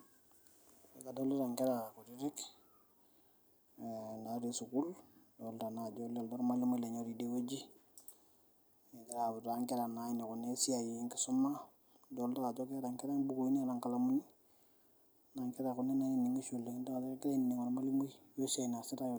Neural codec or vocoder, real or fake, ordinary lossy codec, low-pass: none; real; none; none